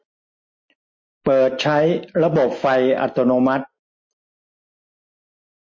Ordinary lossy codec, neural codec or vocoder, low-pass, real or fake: MP3, 32 kbps; none; 7.2 kHz; real